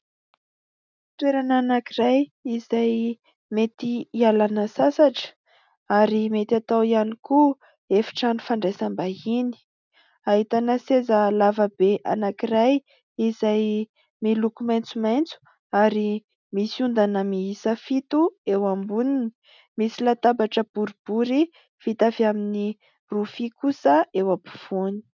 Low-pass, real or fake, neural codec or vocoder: 7.2 kHz; real; none